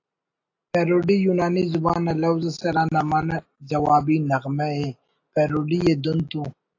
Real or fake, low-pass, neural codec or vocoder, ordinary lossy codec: real; 7.2 kHz; none; AAC, 48 kbps